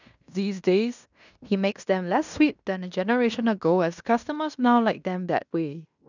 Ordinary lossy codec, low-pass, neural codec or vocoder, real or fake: none; 7.2 kHz; codec, 16 kHz in and 24 kHz out, 0.9 kbps, LongCat-Audio-Codec, fine tuned four codebook decoder; fake